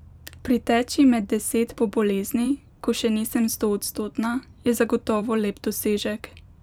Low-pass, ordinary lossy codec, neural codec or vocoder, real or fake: 19.8 kHz; none; vocoder, 44.1 kHz, 128 mel bands every 512 samples, BigVGAN v2; fake